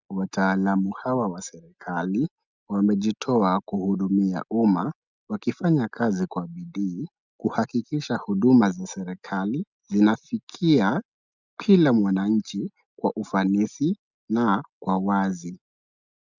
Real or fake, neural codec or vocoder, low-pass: real; none; 7.2 kHz